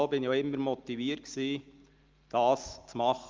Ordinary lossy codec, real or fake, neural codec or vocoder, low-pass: Opus, 32 kbps; real; none; 7.2 kHz